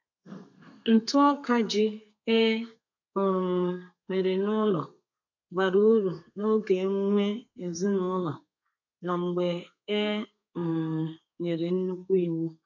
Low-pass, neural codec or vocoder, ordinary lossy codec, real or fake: 7.2 kHz; codec, 32 kHz, 1.9 kbps, SNAC; none; fake